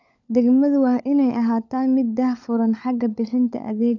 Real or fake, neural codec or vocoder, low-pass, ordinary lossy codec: fake; codec, 16 kHz, 16 kbps, FunCodec, trained on LibriTTS, 50 frames a second; 7.2 kHz; none